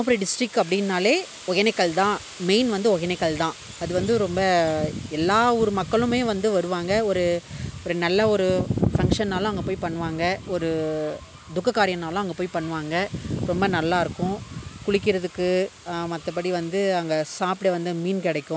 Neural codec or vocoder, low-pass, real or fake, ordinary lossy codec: none; none; real; none